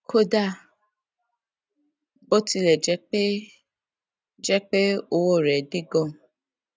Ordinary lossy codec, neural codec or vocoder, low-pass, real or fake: none; none; none; real